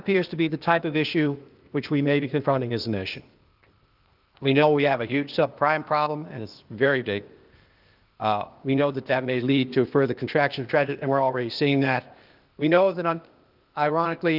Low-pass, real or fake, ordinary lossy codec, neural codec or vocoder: 5.4 kHz; fake; Opus, 32 kbps; codec, 16 kHz, 0.8 kbps, ZipCodec